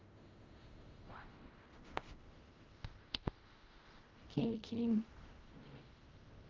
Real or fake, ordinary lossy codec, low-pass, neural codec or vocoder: fake; Opus, 32 kbps; 7.2 kHz; codec, 16 kHz in and 24 kHz out, 0.4 kbps, LongCat-Audio-Codec, fine tuned four codebook decoder